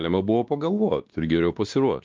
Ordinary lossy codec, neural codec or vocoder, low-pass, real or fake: Opus, 32 kbps; codec, 16 kHz, 2 kbps, X-Codec, WavLM features, trained on Multilingual LibriSpeech; 7.2 kHz; fake